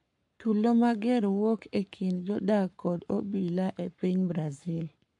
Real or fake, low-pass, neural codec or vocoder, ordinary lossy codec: fake; 10.8 kHz; codec, 44.1 kHz, 7.8 kbps, Pupu-Codec; MP3, 64 kbps